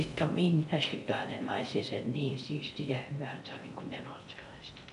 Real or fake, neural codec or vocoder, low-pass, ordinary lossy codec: fake; codec, 16 kHz in and 24 kHz out, 0.6 kbps, FocalCodec, streaming, 4096 codes; 10.8 kHz; none